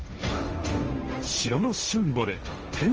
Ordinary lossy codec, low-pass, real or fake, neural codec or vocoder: Opus, 24 kbps; 7.2 kHz; fake; codec, 16 kHz, 1.1 kbps, Voila-Tokenizer